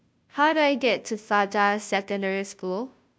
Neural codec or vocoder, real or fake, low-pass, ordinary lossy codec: codec, 16 kHz, 0.5 kbps, FunCodec, trained on Chinese and English, 25 frames a second; fake; none; none